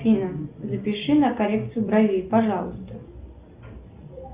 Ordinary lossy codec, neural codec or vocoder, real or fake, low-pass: Opus, 64 kbps; none; real; 3.6 kHz